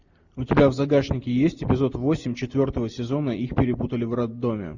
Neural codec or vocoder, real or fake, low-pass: none; real; 7.2 kHz